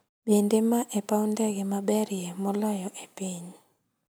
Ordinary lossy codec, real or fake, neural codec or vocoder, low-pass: none; real; none; none